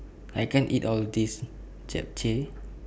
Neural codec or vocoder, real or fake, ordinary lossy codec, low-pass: none; real; none; none